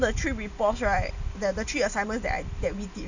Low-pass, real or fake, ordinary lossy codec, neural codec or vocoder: 7.2 kHz; real; none; none